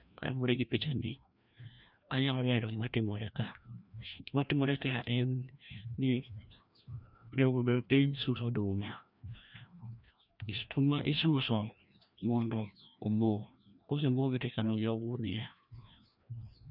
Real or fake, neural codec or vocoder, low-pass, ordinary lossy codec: fake; codec, 16 kHz, 1 kbps, FreqCodec, larger model; 5.4 kHz; none